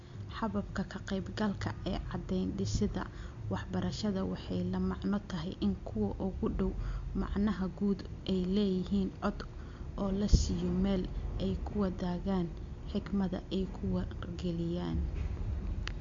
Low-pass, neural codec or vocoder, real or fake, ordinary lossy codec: 7.2 kHz; none; real; MP3, 48 kbps